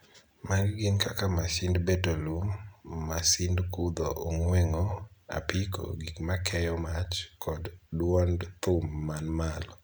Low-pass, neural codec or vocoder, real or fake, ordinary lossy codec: none; none; real; none